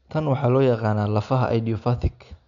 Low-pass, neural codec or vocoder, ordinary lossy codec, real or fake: 7.2 kHz; none; none; real